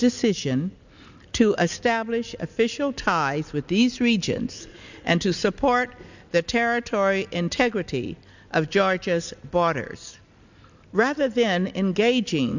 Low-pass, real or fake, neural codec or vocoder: 7.2 kHz; real; none